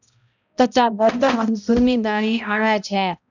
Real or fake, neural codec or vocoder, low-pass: fake; codec, 16 kHz, 0.5 kbps, X-Codec, HuBERT features, trained on balanced general audio; 7.2 kHz